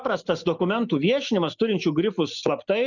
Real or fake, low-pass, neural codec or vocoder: real; 7.2 kHz; none